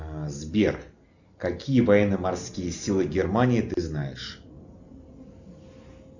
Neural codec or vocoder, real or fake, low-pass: none; real; 7.2 kHz